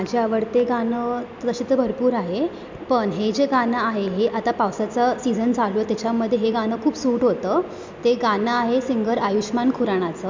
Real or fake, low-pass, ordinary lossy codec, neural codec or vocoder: real; 7.2 kHz; MP3, 64 kbps; none